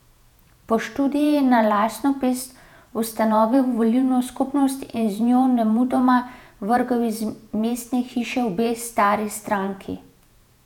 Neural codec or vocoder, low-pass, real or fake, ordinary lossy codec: vocoder, 48 kHz, 128 mel bands, Vocos; 19.8 kHz; fake; none